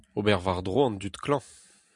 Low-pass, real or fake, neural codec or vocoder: 10.8 kHz; real; none